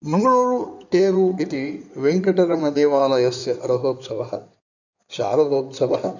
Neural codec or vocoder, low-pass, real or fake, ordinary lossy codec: codec, 16 kHz in and 24 kHz out, 2.2 kbps, FireRedTTS-2 codec; 7.2 kHz; fake; none